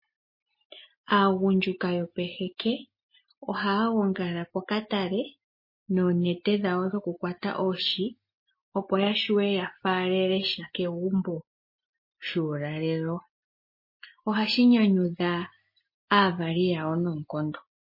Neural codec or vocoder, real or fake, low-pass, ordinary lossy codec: none; real; 5.4 kHz; MP3, 24 kbps